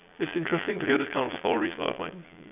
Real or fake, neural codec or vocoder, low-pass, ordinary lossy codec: fake; vocoder, 22.05 kHz, 80 mel bands, Vocos; 3.6 kHz; none